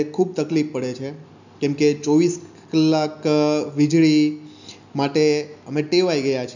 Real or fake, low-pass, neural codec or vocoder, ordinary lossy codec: real; 7.2 kHz; none; none